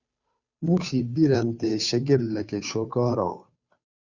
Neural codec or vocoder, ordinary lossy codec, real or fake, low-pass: codec, 16 kHz, 2 kbps, FunCodec, trained on Chinese and English, 25 frames a second; Opus, 64 kbps; fake; 7.2 kHz